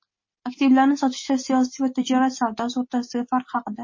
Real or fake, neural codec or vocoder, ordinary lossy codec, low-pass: real; none; MP3, 32 kbps; 7.2 kHz